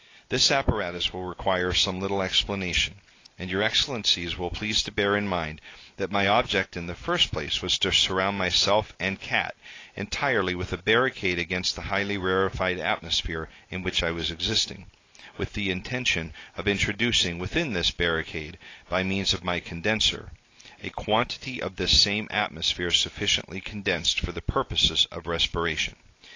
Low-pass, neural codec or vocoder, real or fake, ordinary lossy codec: 7.2 kHz; none; real; AAC, 32 kbps